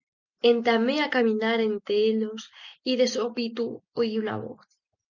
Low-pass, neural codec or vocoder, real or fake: 7.2 kHz; none; real